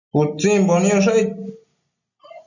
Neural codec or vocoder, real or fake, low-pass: none; real; 7.2 kHz